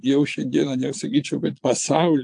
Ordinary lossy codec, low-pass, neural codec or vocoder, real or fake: AAC, 64 kbps; 9.9 kHz; vocoder, 22.05 kHz, 80 mel bands, Vocos; fake